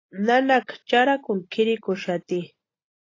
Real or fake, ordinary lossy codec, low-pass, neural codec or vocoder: real; AAC, 32 kbps; 7.2 kHz; none